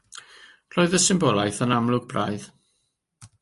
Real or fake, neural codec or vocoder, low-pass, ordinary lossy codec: real; none; 14.4 kHz; MP3, 48 kbps